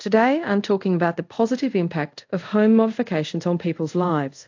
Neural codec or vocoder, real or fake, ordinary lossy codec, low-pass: codec, 24 kHz, 0.5 kbps, DualCodec; fake; AAC, 48 kbps; 7.2 kHz